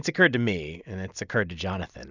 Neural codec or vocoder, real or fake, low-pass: none; real; 7.2 kHz